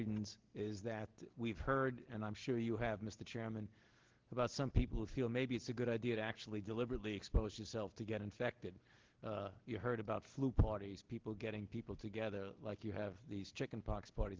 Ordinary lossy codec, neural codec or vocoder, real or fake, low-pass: Opus, 16 kbps; none; real; 7.2 kHz